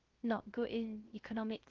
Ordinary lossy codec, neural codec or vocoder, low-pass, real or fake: Opus, 16 kbps; codec, 16 kHz, 0.3 kbps, FocalCodec; 7.2 kHz; fake